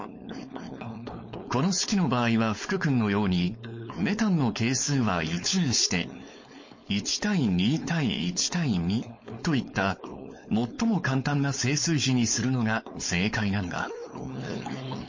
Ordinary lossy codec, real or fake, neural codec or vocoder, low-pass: MP3, 32 kbps; fake; codec, 16 kHz, 4.8 kbps, FACodec; 7.2 kHz